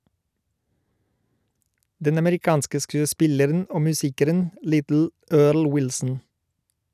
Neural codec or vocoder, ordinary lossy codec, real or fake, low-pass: none; none; real; 14.4 kHz